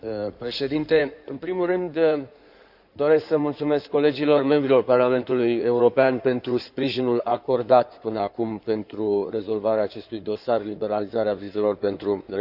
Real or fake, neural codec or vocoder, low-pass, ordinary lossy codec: fake; codec, 16 kHz in and 24 kHz out, 2.2 kbps, FireRedTTS-2 codec; 5.4 kHz; none